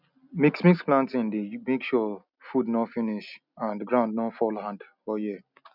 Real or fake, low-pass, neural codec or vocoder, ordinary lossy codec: real; 5.4 kHz; none; none